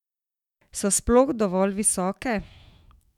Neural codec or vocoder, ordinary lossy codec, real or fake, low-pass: autoencoder, 48 kHz, 128 numbers a frame, DAC-VAE, trained on Japanese speech; none; fake; 19.8 kHz